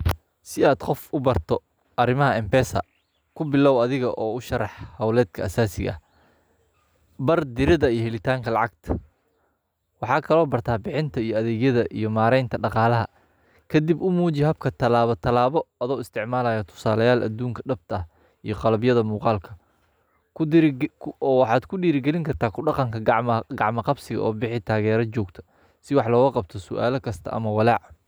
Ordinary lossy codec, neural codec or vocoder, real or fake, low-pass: none; none; real; none